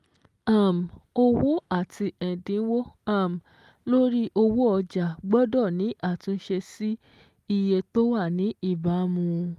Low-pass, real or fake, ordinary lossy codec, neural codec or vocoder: 14.4 kHz; real; Opus, 32 kbps; none